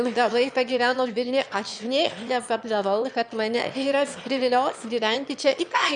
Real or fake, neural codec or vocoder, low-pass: fake; autoencoder, 22.05 kHz, a latent of 192 numbers a frame, VITS, trained on one speaker; 9.9 kHz